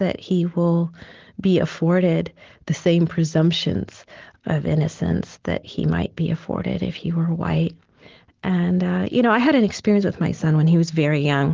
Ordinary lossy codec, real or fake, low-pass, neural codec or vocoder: Opus, 16 kbps; real; 7.2 kHz; none